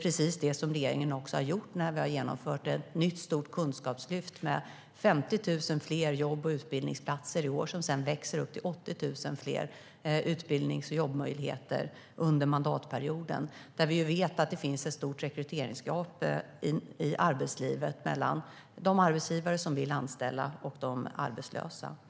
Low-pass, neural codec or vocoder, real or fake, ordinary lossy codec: none; none; real; none